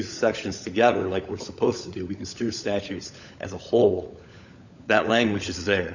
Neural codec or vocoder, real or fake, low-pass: codec, 16 kHz, 16 kbps, FunCodec, trained on LibriTTS, 50 frames a second; fake; 7.2 kHz